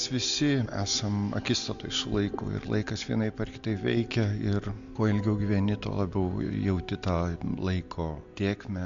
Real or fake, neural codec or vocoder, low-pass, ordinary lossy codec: real; none; 7.2 kHz; MP3, 64 kbps